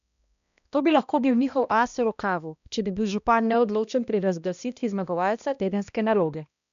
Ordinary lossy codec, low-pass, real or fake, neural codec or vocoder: none; 7.2 kHz; fake; codec, 16 kHz, 1 kbps, X-Codec, HuBERT features, trained on balanced general audio